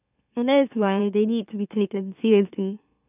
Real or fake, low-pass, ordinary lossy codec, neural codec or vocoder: fake; 3.6 kHz; none; autoencoder, 44.1 kHz, a latent of 192 numbers a frame, MeloTTS